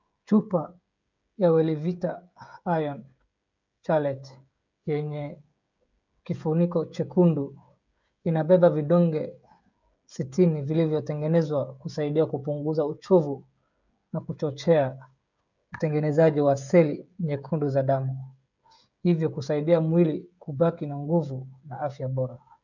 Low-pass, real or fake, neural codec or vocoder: 7.2 kHz; fake; codec, 16 kHz, 16 kbps, FreqCodec, smaller model